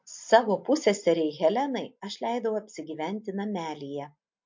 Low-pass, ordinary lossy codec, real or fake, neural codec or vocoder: 7.2 kHz; MP3, 48 kbps; real; none